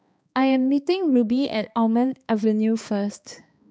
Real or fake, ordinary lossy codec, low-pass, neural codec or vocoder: fake; none; none; codec, 16 kHz, 2 kbps, X-Codec, HuBERT features, trained on balanced general audio